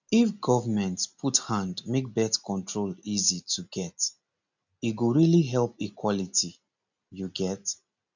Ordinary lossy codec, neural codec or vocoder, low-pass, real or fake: none; none; 7.2 kHz; real